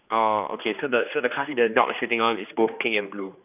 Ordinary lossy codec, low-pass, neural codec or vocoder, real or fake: none; 3.6 kHz; codec, 16 kHz, 2 kbps, X-Codec, HuBERT features, trained on general audio; fake